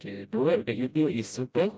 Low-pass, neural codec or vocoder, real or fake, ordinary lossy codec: none; codec, 16 kHz, 0.5 kbps, FreqCodec, smaller model; fake; none